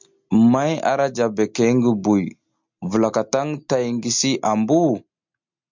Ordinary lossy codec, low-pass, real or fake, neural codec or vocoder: MP3, 64 kbps; 7.2 kHz; real; none